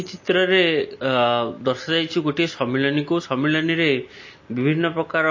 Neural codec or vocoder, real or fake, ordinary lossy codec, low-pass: none; real; MP3, 32 kbps; 7.2 kHz